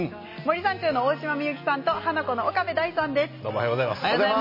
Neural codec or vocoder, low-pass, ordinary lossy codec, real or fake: none; 5.4 kHz; MP3, 24 kbps; real